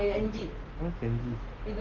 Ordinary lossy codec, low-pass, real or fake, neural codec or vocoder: Opus, 16 kbps; 7.2 kHz; real; none